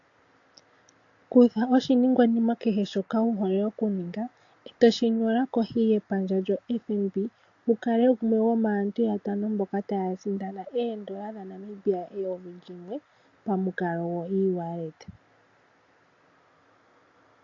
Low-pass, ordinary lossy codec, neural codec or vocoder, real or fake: 7.2 kHz; AAC, 48 kbps; none; real